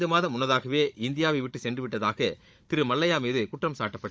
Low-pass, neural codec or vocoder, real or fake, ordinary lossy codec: none; codec, 16 kHz, 16 kbps, FunCodec, trained on Chinese and English, 50 frames a second; fake; none